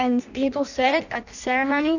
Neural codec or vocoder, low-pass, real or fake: codec, 16 kHz in and 24 kHz out, 0.6 kbps, FireRedTTS-2 codec; 7.2 kHz; fake